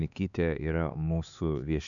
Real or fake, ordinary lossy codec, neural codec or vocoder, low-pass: fake; MP3, 96 kbps; codec, 16 kHz, 4 kbps, X-Codec, HuBERT features, trained on LibriSpeech; 7.2 kHz